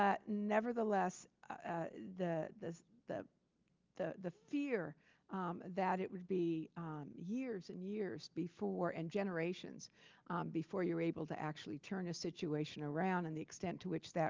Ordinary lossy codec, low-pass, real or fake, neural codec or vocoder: Opus, 24 kbps; 7.2 kHz; real; none